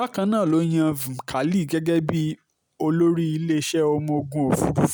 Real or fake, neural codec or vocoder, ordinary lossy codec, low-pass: real; none; none; none